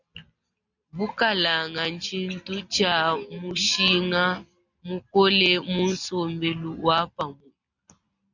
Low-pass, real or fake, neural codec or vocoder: 7.2 kHz; real; none